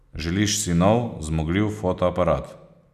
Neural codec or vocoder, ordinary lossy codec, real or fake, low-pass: none; none; real; 14.4 kHz